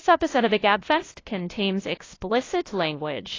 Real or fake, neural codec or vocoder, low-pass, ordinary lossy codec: fake; codec, 16 kHz, 0.5 kbps, FunCodec, trained on LibriTTS, 25 frames a second; 7.2 kHz; AAC, 32 kbps